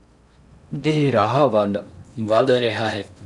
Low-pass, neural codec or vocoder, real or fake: 10.8 kHz; codec, 16 kHz in and 24 kHz out, 0.6 kbps, FocalCodec, streaming, 4096 codes; fake